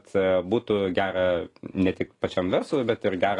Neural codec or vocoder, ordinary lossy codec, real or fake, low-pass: none; AAC, 32 kbps; real; 10.8 kHz